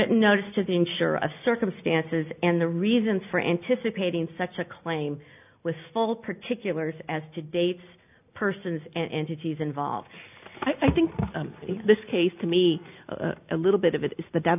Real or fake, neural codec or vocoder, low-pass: real; none; 3.6 kHz